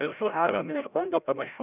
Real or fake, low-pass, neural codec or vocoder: fake; 3.6 kHz; codec, 16 kHz, 0.5 kbps, FreqCodec, larger model